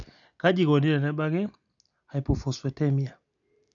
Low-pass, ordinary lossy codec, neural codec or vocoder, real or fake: 7.2 kHz; none; none; real